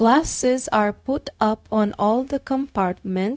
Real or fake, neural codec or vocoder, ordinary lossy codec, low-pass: fake; codec, 16 kHz, 0.4 kbps, LongCat-Audio-Codec; none; none